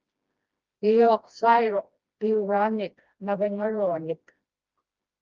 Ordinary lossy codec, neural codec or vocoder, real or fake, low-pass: Opus, 24 kbps; codec, 16 kHz, 1 kbps, FreqCodec, smaller model; fake; 7.2 kHz